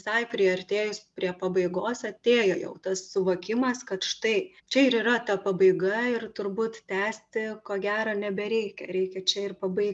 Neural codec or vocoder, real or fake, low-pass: none; real; 10.8 kHz